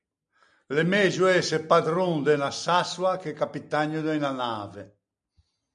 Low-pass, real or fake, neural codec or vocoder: 9.9 kHz; real; none